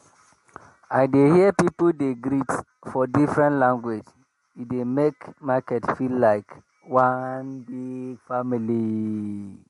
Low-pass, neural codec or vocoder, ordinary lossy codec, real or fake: 14.4 kHz; none; MP3, 48 kbps; real